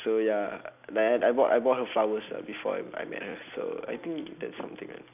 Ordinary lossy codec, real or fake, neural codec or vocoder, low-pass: none; real; none; 3.6 kHz